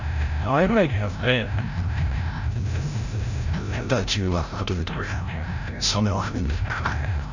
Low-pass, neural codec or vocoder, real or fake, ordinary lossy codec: 7.2 kHz; codec, 16 kHz, 0.5 kbps, FreqCodec, larger model; fake; none